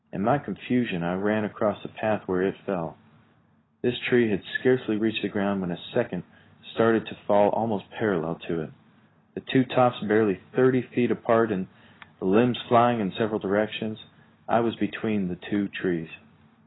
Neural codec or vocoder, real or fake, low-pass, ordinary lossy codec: none; real; 7.2 kHz; AAC, 16 kbps